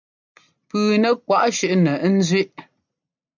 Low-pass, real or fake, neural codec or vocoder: 7.2 kHz; real; none